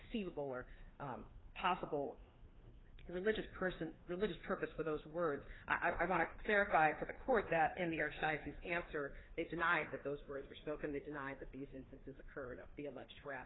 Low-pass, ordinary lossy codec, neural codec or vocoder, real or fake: 7.2 kHz; AAC, 16 kbps; codec, 16 kHz, 2 kbps, FreqCodec, larger model; fake